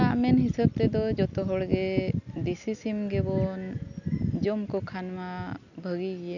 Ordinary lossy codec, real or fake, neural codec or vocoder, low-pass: none; real; none; 7.2 kHz